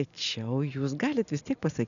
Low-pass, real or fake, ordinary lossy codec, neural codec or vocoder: 7.2 kHz; real; MP3, 96 kbps; none